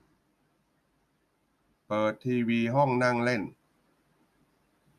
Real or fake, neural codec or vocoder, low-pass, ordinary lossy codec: real; none; 14.4 kHz; none